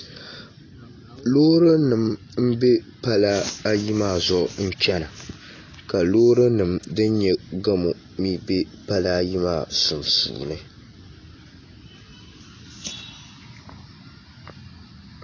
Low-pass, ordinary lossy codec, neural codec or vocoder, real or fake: 7.2 kHz; AAC, 32 kbps; none; real